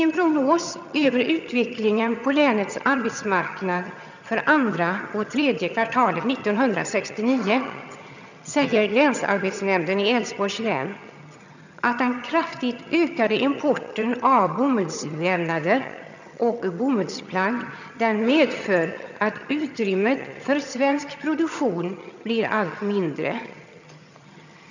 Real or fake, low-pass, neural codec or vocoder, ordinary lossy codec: fake; 7.2 kHz; vocoder, 22.05 kHz, 80 mel bands, HiFi-GAN; none